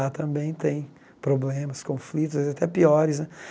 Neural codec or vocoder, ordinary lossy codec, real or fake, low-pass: none; none; real; none